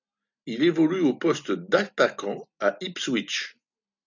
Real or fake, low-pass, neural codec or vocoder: real; 7.2 kHz; none